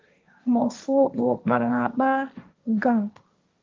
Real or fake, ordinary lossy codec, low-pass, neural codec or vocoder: fake; Opus, 32 kbps; 7.2 kHz; codec, 16 kHz, 1.1 kbps, Voila-Tokenizer